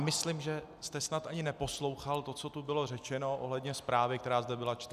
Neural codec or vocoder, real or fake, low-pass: none; real; 14.4 kHz